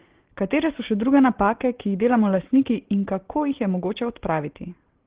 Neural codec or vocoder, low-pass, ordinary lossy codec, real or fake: none; 3.6 kHz; Opus, 16 kbps; real